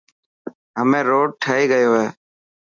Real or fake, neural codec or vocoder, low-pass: real; none; 7.2 kHz